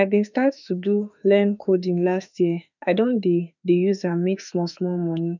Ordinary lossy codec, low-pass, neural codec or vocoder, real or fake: none; 7.2 kHz; autoencoder, 48 kHz, 32 numbers a frame, DAC-VAE, trained on Japanese speech; fake